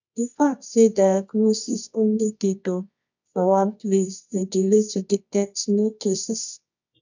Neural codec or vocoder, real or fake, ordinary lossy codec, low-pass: codec, 24 kHz, 0.9 kbps, WavTokenizer, medium music audio release; fake; none; 7.2 kHz